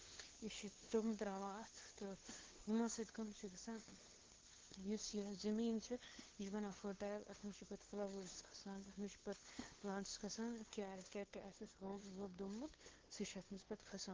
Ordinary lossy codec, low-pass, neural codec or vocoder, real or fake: Opus, 16 kbps; 7.2 kHz; codec, 16 kHz, 2 kbps, FunCodec, trained on LibriTTS, 25 frames a second; fake